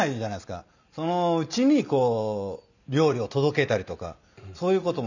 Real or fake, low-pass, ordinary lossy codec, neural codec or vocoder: real; 7.2 kHz; none; none